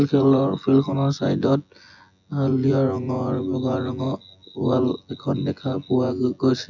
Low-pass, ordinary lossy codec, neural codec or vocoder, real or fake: 7.2 kHz; none; vocoder, 24 kHz, 100 mel bands, Vocos; fake